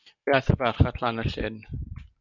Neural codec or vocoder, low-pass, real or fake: none; 7.2 kHz; real